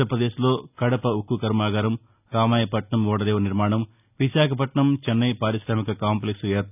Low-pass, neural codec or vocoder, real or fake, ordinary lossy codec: 3.6 kHz; none; real; none